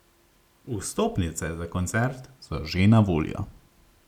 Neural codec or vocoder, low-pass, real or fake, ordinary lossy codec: none; 19.8 kHz; real; none